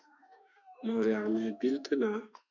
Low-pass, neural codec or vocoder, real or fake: 7.2 kHz; autoencoder, 48 kHz, 32 numbers a frame, DAC-VAE, trained on Japanese speech; fake